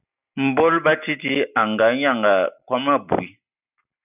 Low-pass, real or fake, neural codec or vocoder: 3.6 kHz; real; none